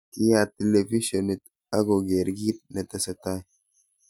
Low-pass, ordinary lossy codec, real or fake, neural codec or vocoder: 19.8 kHz; none; real; none